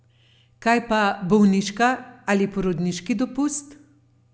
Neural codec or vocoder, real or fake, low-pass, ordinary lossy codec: none; real; none; none